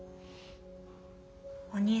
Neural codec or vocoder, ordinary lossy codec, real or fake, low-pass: none; none; real; none